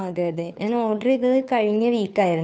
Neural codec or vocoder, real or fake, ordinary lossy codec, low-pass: codec, 16 kHz, 2 kbps, FunCodec, trained on Chinese and English, 25 frames a second; fake; none; none